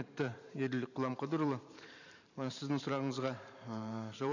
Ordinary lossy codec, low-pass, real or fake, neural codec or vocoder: none; 7.2 kHz; fake; vocoder, 44.1 kHz, 128 mel bands every 512 samples, BigVGAN v2